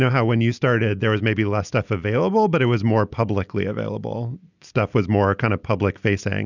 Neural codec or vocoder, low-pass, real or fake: none; 7.2 kHz; real